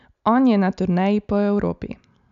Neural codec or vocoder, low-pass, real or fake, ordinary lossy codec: none; 7.2 kHz; real; none